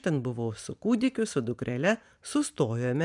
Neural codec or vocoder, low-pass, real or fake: vocoder, 44.1 kHz, 128 mel bands every 512 samples, BigVGAN v2; 10.8 kHz; fake